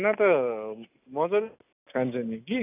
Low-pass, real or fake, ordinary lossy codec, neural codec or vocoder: 3.6 kHz; real; none; none